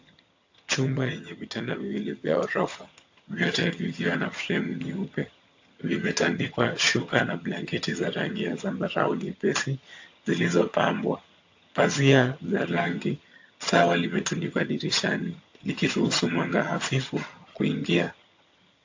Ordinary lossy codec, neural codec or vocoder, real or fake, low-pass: AAC, 48 kbps; vocoder, 22.05 kHz, 80 mel bands, HiFi-GAN; fake; 7.2 kHz